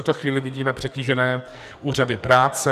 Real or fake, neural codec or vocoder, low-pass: fake; codec, 44.1 kHz, 2.6 kbps, SNAC; 14.4 kHz